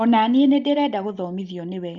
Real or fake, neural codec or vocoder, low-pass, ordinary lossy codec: real; none; 7.2 kHz; Opus, 24 kbps